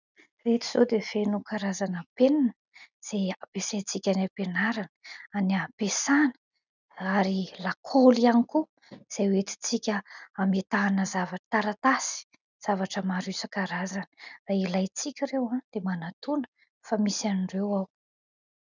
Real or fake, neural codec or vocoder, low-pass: real; none; 7.2 kHz